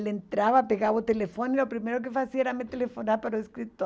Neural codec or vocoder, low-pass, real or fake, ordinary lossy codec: none; none; real; none